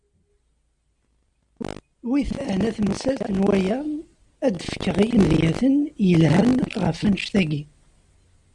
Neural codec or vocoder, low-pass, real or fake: none; 10.8 kHz; real